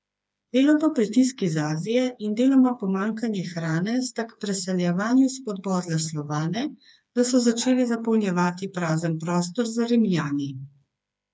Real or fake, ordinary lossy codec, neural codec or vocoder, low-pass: fake; none; codec, 16 kHz, 4 kbps, FreqCodec, smaller model; none